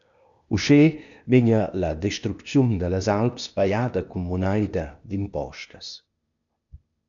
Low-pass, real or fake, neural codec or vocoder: 7.2 kHz; fake; codec, 16 kHz, 0.8 kbps, ZipCodec